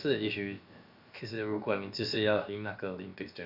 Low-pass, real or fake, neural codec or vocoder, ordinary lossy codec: 5.4 kHz; fake; codec, 16 kHz, about 1 kbps, DyCAST, with the encoder's durations; none